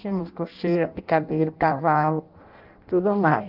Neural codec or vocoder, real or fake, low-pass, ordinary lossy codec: codec, 16 kHz in and 24 kHz out, 0.6 kbps, FireRedTTS-2 codec; fake; 5.4 kHz; Opus, 32 kbps